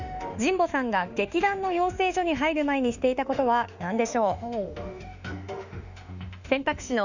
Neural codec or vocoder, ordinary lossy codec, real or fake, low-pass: autoencoder, 48 kHz, 32 numbers a frame, DAC-VAE, trained on Japanese speech; none; fake; 7.2 kHz